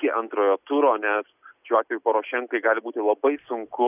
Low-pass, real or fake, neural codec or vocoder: 3.6 kHz; real; none